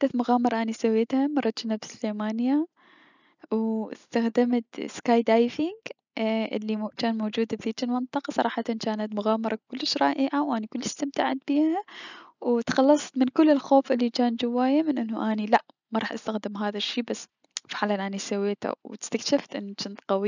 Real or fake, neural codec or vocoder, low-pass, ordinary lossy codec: real; none; 7.2 kHz; none